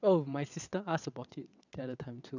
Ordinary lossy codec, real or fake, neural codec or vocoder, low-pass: none; fake; vocoder, 22.05 kHz, 80 mel bands, WaveNeXt; 7.2 kHz